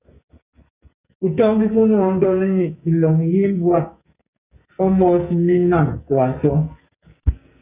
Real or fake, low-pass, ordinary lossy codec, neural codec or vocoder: fake; 3.6 kHz; Opus, 64 kbps; codec, 44.1 kHz, 2.6 kbps, SNAC